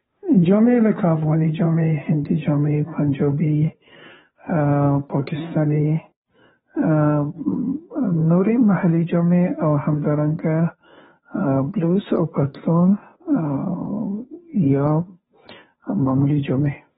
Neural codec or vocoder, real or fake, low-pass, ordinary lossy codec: codec, 16 kHz, 2 kbps, FunCodec, trained on Chinese and English, 25 frames a second; fake; 7.2 kHz; AAC, 16 kbps